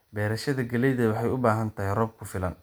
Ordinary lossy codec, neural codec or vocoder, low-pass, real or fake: none; none; none; real